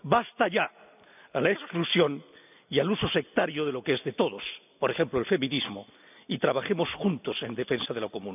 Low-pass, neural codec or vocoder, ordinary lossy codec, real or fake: 3.6 kHz; none; none; real